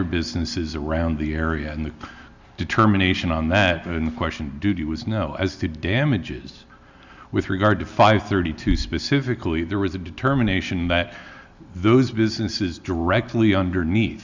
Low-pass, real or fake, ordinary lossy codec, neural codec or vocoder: 7.2 kHz; real; Opus, 64 kbps; none